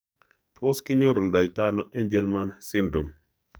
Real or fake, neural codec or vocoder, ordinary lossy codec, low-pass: fake; codec, 44.1 kHz, 2.6 kbps, SNAC; none; none